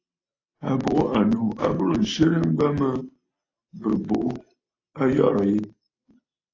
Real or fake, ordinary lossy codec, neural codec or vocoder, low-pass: real; AAC, 32 kbps; none; 7.2 kHz